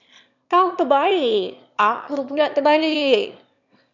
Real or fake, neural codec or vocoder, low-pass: fake; autoencoder, 22.05 kHz, a latent of 192 numbers a frame, VITS, trained on one speaker; 7.2 kHz